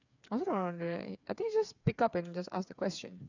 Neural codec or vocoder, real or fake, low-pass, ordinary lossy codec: codec, 16 kHz, 8 kbps, FreqCodec, smaller model; fake; 7.2 kHz; none